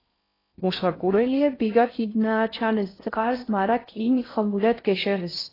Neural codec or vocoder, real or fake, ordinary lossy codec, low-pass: codec, 16 kHz in and 24 kHz out, 0.6 kbps, FocalCodec, streaming, 4096 codes; fake; AAC, 24 kbps; 5.4 kHz